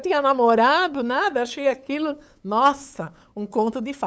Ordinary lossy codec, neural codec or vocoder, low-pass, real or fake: none; codec, 16 kHz, 8 kbps, FunCodec, trained on LibriTTS, 25 frames a second; none; fake